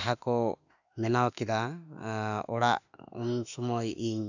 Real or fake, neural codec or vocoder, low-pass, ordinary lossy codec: fake; autoencoder, 48 kHz, 32 numbers a frame, DAC-VAE, trained on Japanese speech; 7.2 kHz; none